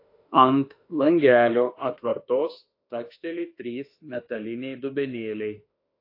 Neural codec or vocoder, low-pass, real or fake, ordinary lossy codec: autoencoder, 48 kHz, 32 numbers a frame, DAC-VAE, trained on Japanese speech; 5.4 kHz; fake; AAC, 32 kbps